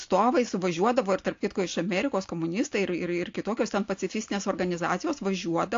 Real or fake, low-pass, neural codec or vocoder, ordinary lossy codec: real; 7.2 kHz; none; AAC, 48 kbps